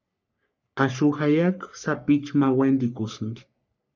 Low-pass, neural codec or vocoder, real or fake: 7.2 kHz; codec, 44.1 kHz, 3.4 kbps, Pupu-Codec; fake